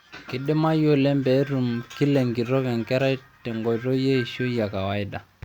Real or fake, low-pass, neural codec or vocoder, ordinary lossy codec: real; 19.8 kHz; none; Opus, 64 kbps